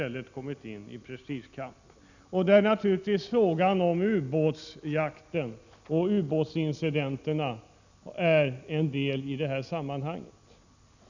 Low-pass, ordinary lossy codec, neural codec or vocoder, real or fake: 7.2 kHz; none; none; real